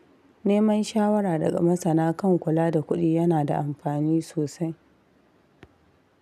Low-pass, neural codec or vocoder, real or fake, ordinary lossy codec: 14.4 kHz; none; real; none